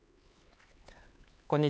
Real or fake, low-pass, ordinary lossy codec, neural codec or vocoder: fake; none; none; codec, 16 kHz, 4 kbps, X-Codec, HuBERT features, trained on LibriSpeech